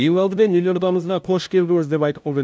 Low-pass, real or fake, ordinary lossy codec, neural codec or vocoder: none; fake; none; codec, 16 kHz, 0.5 kbps, FunCodec, trained on LibriTTS, 25 frames a second